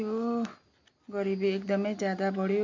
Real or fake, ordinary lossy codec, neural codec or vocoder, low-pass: real; MP3, 64 kbps; none; 7.2 kHz